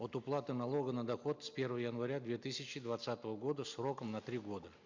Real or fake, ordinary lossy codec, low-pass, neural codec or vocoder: real; Opus, 64 kbps; 7.2 kHz; none